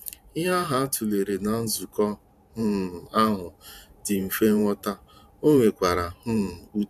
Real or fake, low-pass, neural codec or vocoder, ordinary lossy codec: fake; 14.4 kHz; vocoder, 44.1 kHz, 128 mel bands every 512 samples, BigVGAN v2; none